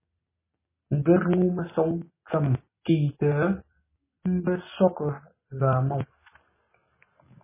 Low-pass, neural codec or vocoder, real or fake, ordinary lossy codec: 3.6 kHz; none; real; MP3, 16 kbps